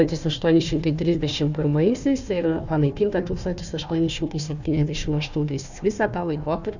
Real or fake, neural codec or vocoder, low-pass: fake; codec, 16 kHz, 1 kbps, FunCodec, trained on Chinese and English, 50 frames a second; 7.2 kHz